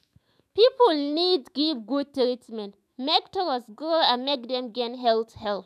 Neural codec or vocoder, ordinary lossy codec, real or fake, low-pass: autoencoder, 48 kHz, 128 numbers a frame, DAC-VAE, trained on Japanese speech; none; fake; 14.4 kHz